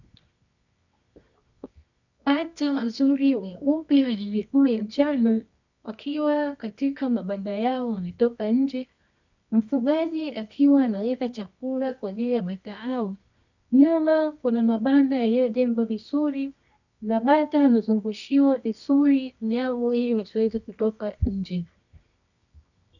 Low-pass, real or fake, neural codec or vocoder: 7.2 kHz; fake; codec, 24 kHz, 0.9 kbps, WavTokenizer, medium music audio release